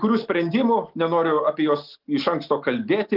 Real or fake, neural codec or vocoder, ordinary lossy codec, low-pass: real; none; Opus, 32 kbps; 5.4 kHz